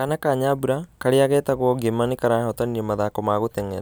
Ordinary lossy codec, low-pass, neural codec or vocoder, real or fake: none; none; none; real